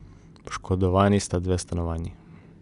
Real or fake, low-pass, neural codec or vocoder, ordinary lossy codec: real; 10.8 kHz; none; MP3, 96 kbps